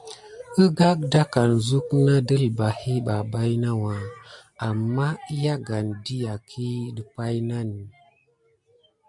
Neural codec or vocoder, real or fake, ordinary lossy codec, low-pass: none; real; MP3, 96 kbps; 10.8 kHz